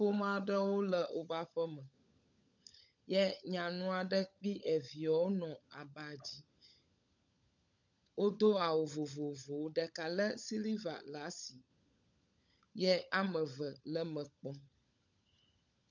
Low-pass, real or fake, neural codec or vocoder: 7.2 kHz; fake; codec, 16 kHz, 16 kbps, FunCodec, trained on LibriTTS, 50 frames a second